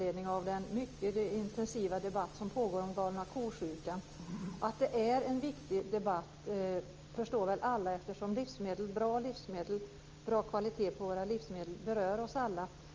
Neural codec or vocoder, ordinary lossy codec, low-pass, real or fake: none; Opus, 24 kbps; 7.2 kHz; real